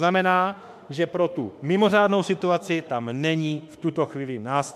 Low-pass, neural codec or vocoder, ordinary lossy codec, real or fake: 14.4 kHz; autoencoder, 48 kHz, 32 numbers a frame, DAC-VAE, trained on Japanese speech; MP3, 96 kbps; fake